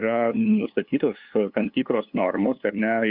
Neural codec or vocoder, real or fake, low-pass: codec, 16 kHz, 2 kbps, FunCodec, trained on LibriTTS, 25 frames a second; fake; 5.4 kHz